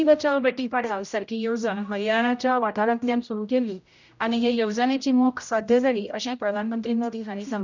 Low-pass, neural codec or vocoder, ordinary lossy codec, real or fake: 7.2 kHz; codec, 16 kHz, 0.5 kbps, X-Codec, HuBERT features, trained on general audio; none; fake